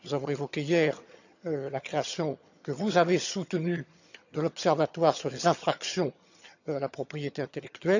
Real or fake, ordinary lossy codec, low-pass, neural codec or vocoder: fake; none; 7.2 kHz; vocoder, 22.05 kHz, 80 mel bands, HiFi-GAN